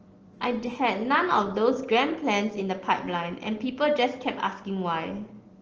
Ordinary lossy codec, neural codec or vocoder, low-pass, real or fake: Opus, 16 kbps; none; 7.2 kHz; real